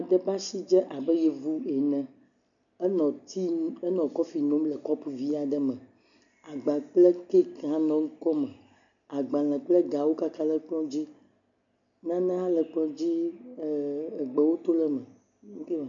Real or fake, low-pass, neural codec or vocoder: real; 7.2 kHz; none